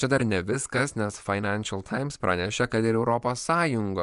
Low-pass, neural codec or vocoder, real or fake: 10.8 kHz; vocoder, 24 kHz, 100 mel bands, Vocos; fake